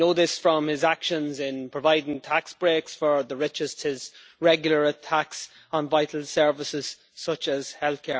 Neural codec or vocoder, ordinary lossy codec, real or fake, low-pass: none; none; real; none